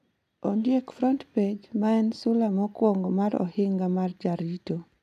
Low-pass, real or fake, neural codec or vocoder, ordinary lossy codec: 14.4 kHz; real; none; none